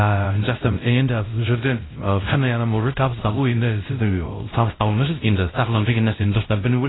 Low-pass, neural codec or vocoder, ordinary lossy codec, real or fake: 7.2 kHz; codec, 16 kHz, 0.5 kbps, X-Codec, WavLM features, trained on Multilingual LibriSpeech; AAC, 16 kbps; fake